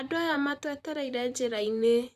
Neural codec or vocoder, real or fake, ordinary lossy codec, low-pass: vocoder, 44.1 kHz, 128 mel bands, Pupu-Vocoder; fake; Opus, 64 kbps; 14.4 kHz